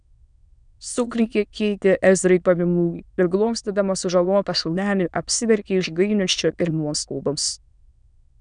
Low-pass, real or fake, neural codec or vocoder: 9.9 kHz; fake; autoencoder, 22.05 kHz, a latent of 192 numbers a frame, VITS, trained on many speakers